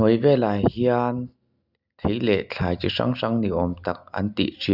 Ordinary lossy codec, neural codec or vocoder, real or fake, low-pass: none; none; real; 5.4 kHz